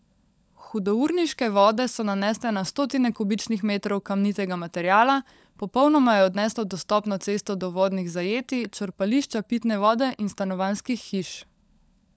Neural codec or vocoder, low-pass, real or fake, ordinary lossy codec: codec, 16 kHz, 16 kbps, FunCodec, trained on LibriTTS, 50 frames a second; none; fake; none